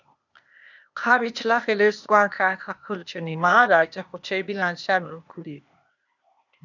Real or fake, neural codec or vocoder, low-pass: fake; codec, 16 kHz, 0.8 kbps, ZipCodec; 7.2 kHz